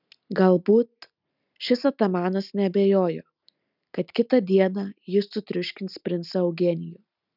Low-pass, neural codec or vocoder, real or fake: 5.4 kHz; none; real